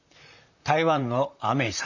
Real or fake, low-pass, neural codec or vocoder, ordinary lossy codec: fake; 7.2 kHz; vocoder, 44.1 kHz, 128 mel bands, Pupu-Vocoder; none